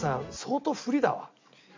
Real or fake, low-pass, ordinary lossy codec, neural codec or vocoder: real; 7.2 kHz; MP3, 64 kbps; none